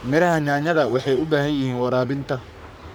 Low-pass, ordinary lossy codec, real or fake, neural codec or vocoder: none; none; fake; codec, 44.1 kHz, 3.4 kbps, Pupu-Codec